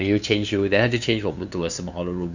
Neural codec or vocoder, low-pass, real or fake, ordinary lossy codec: codec, 16 kHz, 2 kbps, FunCodec, trained on LibriTTS, 25 frames a second; 7.2 kHz; fake; none